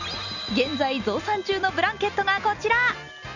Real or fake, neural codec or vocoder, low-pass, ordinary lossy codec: real; none; 7.2 kHz; none